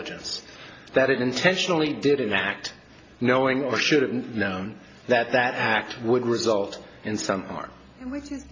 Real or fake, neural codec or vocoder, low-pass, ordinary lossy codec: real; none; 7.2 kHz; AAC, 32 kbps